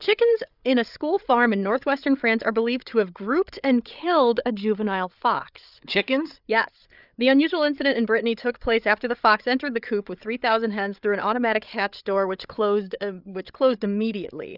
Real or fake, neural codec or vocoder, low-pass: fake; codec, 16 kHz, 8 kbps, FreqCodec, larger model; 5.4 kHz